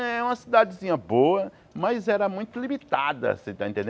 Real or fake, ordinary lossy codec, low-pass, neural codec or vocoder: real; none; none; none